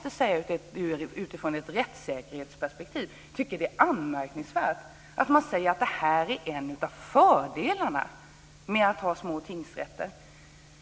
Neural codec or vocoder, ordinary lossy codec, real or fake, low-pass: none; none; real; none